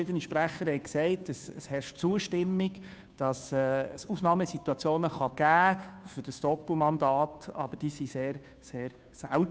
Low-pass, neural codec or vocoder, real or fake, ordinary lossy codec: none; codec, 16 kHz, 2 kbps, FunCodec, trained on Chinese and English, 25 frames a second; fake; none